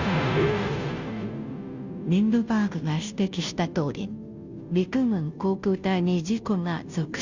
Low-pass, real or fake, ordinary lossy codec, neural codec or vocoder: 7.2 kHz; fake; Opus, 64 kbps; codec, 16 kHz, 0.5 kbps, FunCodec, trained on Chinese and English, 25 frames a second